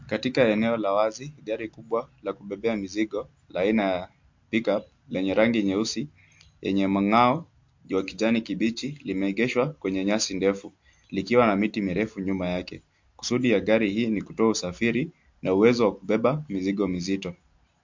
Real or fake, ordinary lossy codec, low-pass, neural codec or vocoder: real; MP3, 48 kbps; 7.2 kHz; none